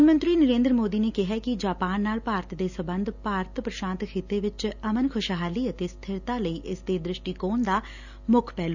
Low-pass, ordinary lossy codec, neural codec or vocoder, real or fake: 7.2 kHz; none; none; real